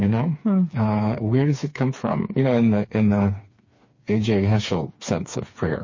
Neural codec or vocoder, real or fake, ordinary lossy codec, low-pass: codec, 16 kHz, 4 kbps, FreqCodec, smaller model; fake; MP3, 32 kbps; 7.2 kHz